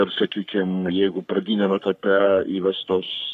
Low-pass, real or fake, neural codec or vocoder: 14.4 kHz; fake; codec, 44.1 kHz, 3.4 kbps, Pupu-Codec